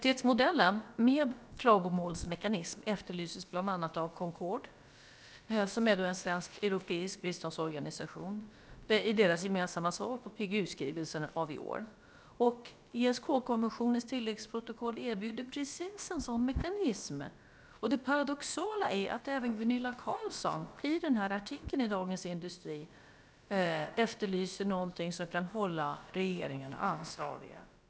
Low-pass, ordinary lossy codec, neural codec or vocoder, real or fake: none; none; codec, 16 kHz, about 1 kbps, DyCAST, with the encoder's durations; fake